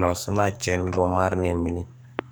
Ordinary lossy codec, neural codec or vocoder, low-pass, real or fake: none; codec, 44.1 kHz, 2.6 kbps, SNAC; none; fake